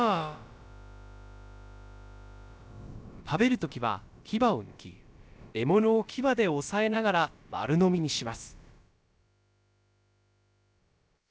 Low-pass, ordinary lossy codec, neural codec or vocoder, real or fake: none; none; codec, 16 kHz, about 1 kbps, DyCAST, with the encoder's durations; fake